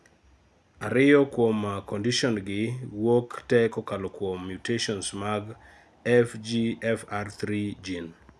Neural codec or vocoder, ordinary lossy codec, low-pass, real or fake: none; none; none; real